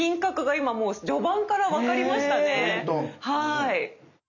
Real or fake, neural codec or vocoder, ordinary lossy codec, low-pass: real; none; none; 7.2 kHz